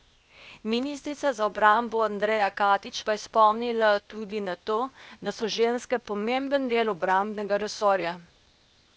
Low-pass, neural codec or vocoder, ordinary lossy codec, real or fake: none; codec, 16 kHz, 0.8 kbps, ZipCodec; none; fake